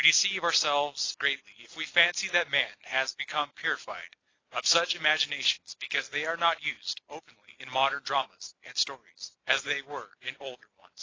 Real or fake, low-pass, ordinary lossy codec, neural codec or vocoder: real; 7.2 kHz; AAC, 32 kbps; none